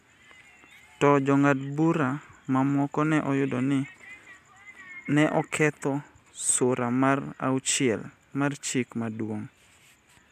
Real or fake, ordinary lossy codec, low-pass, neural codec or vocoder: real; none; 14.4 kHz; none